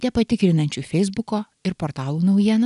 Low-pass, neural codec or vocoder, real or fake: 10.8 kHz; none; real